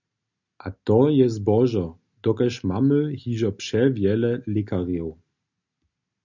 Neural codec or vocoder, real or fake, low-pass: none; real; 7.2 kHz